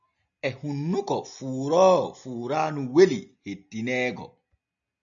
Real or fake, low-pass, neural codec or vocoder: real; 7.2 kHz; none